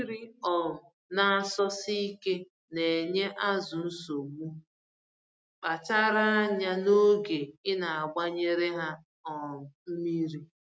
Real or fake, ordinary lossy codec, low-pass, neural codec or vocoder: real; none; none; none